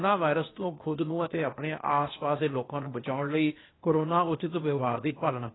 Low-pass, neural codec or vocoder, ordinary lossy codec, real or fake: 7.2 kHz; codec, 16 kHz, 0.8 kbps, ZipCodec; AAC, 16 kbps; fake